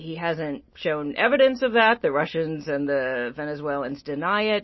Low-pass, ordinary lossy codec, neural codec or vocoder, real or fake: 7.2 kHz; MP3, 24 kbps; none; real